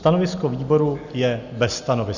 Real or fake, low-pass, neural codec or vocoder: real; 7.2 kHz; none